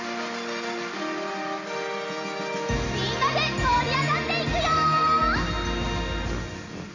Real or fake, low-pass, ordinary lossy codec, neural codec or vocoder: real; 7.2 kHz; none; none